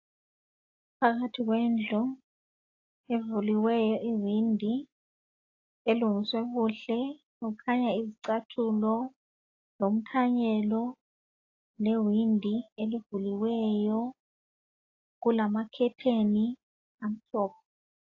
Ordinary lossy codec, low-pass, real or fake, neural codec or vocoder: AAC, 32 kbps; 7.2 kHz; real; none